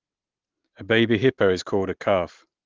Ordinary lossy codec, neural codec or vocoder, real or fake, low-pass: Opus, 16 kbps; none; real; 7.2 kHz